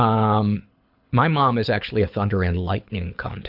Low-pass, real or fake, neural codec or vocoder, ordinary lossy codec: 5.4 kHz; fake; codec, 24 kHz, 6 kbps, HILCodec; AAC, 48 kbps